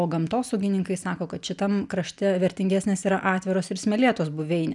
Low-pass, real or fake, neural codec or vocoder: 10.8 kHz; real; none